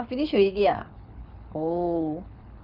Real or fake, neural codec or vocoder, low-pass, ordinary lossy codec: fake; codec, 24 kHz, 6 kbps, HILCodec; 5.4 kHz; none